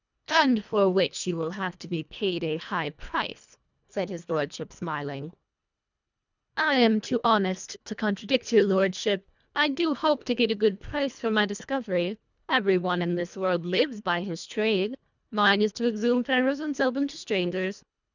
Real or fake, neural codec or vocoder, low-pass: fake; codec, 24 kHz, 1.5 kbps, HILCodec; 7.2 kHz